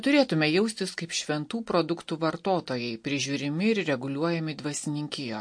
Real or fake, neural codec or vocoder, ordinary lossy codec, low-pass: real; none; MP3, 48 kbps; 9.9 kHz